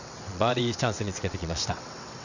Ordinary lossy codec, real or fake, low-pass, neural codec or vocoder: none; fake; 7.2 kHz; vocoder, 22.05 kHz, 80 mel bands, WaveNeXt